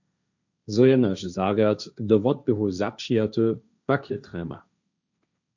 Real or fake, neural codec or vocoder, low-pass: fake; codec, 16 kHz, 1.1 kbps, Voila-Tokenizer; 7.2 kHz